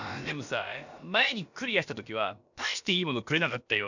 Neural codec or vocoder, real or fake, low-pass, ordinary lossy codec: codec, 16 kHz, about 1 kbps, DyCAST, with the encoder's durations; fake; 7.2 kHz; none